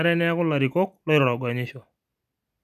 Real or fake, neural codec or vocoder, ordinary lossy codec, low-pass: real; none; none; 14.4 kHz